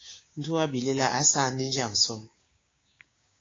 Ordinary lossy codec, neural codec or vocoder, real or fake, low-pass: AAC, 32 kbps; codec, 16 kHz, 6 kbps, DAC; fake; 7.2 kHz